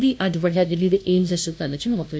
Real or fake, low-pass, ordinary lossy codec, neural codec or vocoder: fake; none; none; codec, 16 kHz, 0.5 kbps, FunCodec, trained on LibriTTS, 25 frames a second